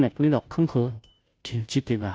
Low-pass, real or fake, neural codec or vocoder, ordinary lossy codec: none; fake; codec, 16 kHz, 0.5 kbps, FunCodec, trained on Chinese and English, 25 frames a second; none